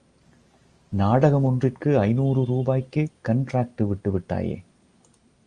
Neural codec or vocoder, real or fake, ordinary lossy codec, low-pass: none; real; Opus, 32 kbps; 9.9 kHz